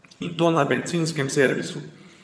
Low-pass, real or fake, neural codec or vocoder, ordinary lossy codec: none; fake; vocoder, 22.05 kHz, 80 mel bands, HiFi-GAN; none